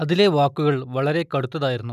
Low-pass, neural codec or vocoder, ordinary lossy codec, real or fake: 14.4 kHz; none; none; real